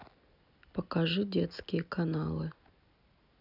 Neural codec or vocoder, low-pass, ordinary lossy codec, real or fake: none; 5.4 kHz; none; real